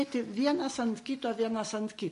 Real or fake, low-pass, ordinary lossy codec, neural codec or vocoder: real; 14.4 kHz; MP3, 48 kbps; none